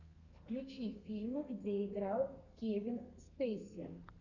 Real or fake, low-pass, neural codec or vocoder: fake; 7.2 kHz; codec, 32 kHz, 1.9 kbps, SNAC